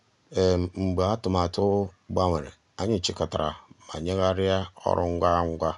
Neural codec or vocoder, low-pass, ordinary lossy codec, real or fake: none; 10.8 kHz; none; real